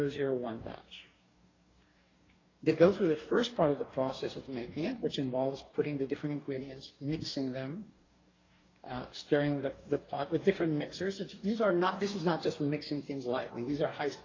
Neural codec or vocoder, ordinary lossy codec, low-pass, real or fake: codec, 44.1 kHz, 2.6 kbps, DAC; AAC, 32 kbps; 7.2 kHz; fake